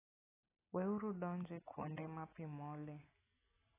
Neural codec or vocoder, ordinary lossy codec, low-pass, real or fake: none; AAC, 16 kbps; 3.6 kHz; real